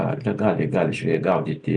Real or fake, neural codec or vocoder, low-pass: real; none; 9.9 kHz